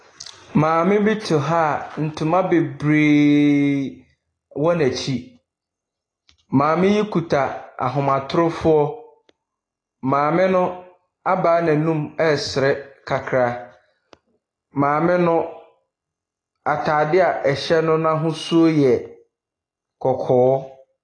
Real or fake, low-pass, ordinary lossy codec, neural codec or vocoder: real; 9.9 kHz; AAC, 32 kbps; none